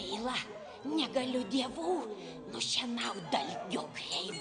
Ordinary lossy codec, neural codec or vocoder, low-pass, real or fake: Opus, 64 kbps; none; 9.9 kHz; real